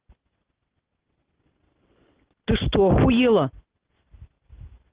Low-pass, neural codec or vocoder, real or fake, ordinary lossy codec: 3.6 kHz; none; real; Opus, 16 kbps